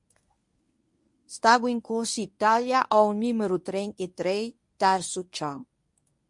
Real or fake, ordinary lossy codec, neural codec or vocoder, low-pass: fake; MP3, 64 kbps; codec, 24 kHz, 0.9 kbps, WavTokenizer, medium speech release version 1; 10.8 kHz